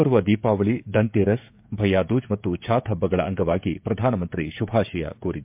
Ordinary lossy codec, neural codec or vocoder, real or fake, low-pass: MP3, 32 kbps; codec, 16 kHz, 16 kbps, FreqCodec, smaller model; fake; 3.6 kHz